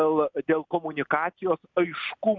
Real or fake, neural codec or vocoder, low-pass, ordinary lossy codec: real; none; 7.2 kHz; MP3, 64 kbps